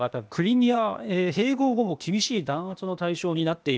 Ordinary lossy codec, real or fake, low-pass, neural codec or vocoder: none; fake; none; codec, 16 kHz, 0.8 kbps, ZipCodec